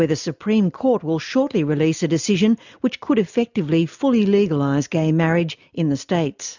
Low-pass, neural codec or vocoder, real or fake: 7.2 kHz; none; real